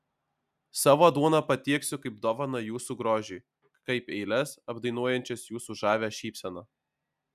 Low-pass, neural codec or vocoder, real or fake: 14.4 kHz; none; real